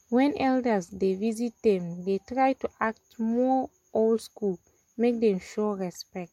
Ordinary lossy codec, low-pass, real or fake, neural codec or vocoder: MP3, 64 kbps; 19.8 kHz; real; none